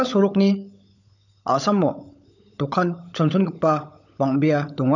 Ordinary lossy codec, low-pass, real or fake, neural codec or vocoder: none; 7.2 kHz; fake; codec, 16 kHz, 16 kbps, FunCodec, trained on LibriTTS, 50 frames a second